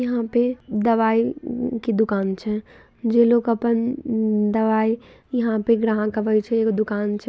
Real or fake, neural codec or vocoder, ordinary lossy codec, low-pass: real; none; none; none